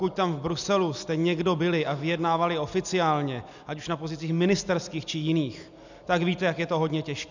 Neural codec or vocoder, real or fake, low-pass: none; real; 7.2 kHz